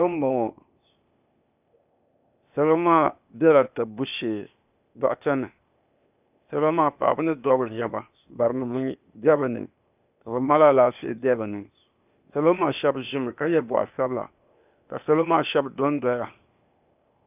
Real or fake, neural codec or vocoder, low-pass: fake; codec, 16 kHz, 0.8 kbps, ZipCodec; 3.6 kHz